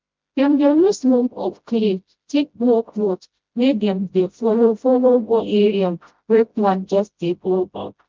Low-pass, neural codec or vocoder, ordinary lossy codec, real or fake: 7.2 kHz; codec, 16 kHz, 0.5 kbps, FreqCodec, smaller model; Opus, 24 kbps; fake